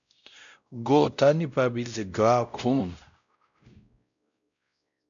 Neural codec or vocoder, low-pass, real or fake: codec, 16 kHz, 0.5 kbps, X-Codec, WavLM features, trained on Multilingual LibriSpeech; 7.2 kHz; fake